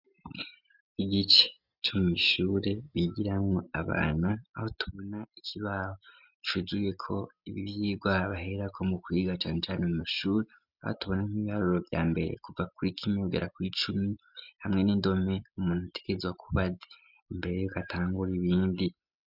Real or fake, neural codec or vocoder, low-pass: real; none; 5.4 kHz